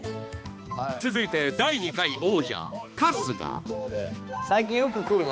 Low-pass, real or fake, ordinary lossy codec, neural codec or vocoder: none; fake; none; codec, 16 kHz, 2 kbps, X-Codec, HuBERT features, trained on balanced general audio